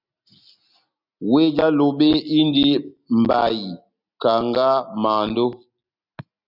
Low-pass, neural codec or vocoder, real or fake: 5.4 kHz; none; real